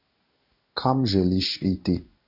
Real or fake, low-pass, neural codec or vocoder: fake; 5.4 kHz; codec, 16 kHz in and 24 kHz out, 1 kbps, XY-Tokenizer